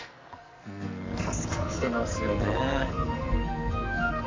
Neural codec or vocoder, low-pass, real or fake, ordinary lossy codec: codec, 44.1 kHz, 2.6 kbps, SNAC; 7.2 kHz; fake; MP3, 48 kbps